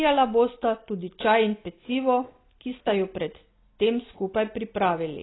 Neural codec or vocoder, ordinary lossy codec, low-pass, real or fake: none; AAC, 16 kbps; 7.2 kHz; real